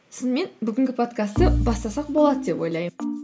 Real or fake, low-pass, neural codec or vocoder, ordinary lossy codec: real; none; none; none